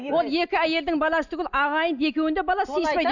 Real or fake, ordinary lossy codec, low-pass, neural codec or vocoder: real; none; 7.2 kHz; none